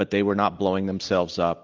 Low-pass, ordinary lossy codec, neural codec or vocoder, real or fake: 7.2 kHz; Opus, 24 kbps; none; real